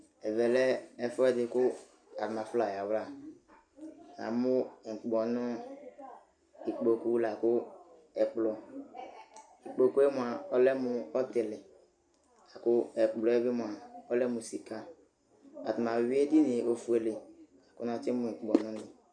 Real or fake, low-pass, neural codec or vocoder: real; 9.9 kHz; none